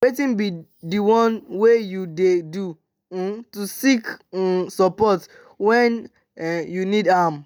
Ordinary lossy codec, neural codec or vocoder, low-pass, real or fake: none; none; none; real